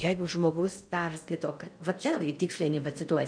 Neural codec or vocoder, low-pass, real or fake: codec, 16 kHz in and 24 kHz out, 0.6 kbps, FocalCodec, streaming, 4096 codes; 9.9 kHz; fake